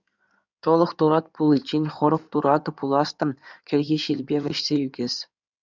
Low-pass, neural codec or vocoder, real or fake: 7.2 kHz; codec, 16 kHz in and 24 kHz out, 2.2 kbps, FireRedTTS-2 codec; fake